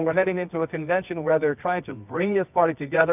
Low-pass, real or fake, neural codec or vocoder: 3.6 kHz; fake; codec, 24 kHz, 0.9 kbps, WavTokenizer, medium music audio release